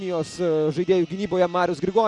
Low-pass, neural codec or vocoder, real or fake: 10.8 kHz; none; real